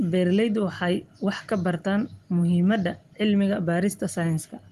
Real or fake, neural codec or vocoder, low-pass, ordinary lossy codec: real; none; 14.4 kHz; Opus, 24 kbps